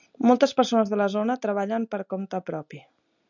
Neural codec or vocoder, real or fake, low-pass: none; real; 7.2 kHz